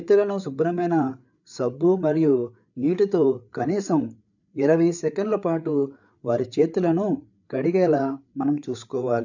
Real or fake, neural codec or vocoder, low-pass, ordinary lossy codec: fake; codec, 16 kHz, 8 kbps, FreqCodec, larger model; 7.2 kHz; none